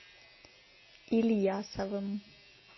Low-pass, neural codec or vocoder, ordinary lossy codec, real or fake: 7.2 kHz; none; MP3, 24 kbps; real